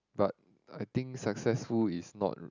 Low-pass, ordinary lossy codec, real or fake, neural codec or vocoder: 7.2 kHz; none; real; none